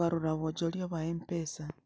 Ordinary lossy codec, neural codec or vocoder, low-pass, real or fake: none; none; none; real